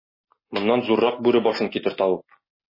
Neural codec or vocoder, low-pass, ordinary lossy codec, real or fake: none; 5.4 kHz; MP3, 24 kbps; real